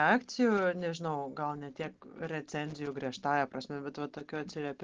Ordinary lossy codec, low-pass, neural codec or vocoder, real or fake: Opus, 16 kbps; 7.2 kHz; none; real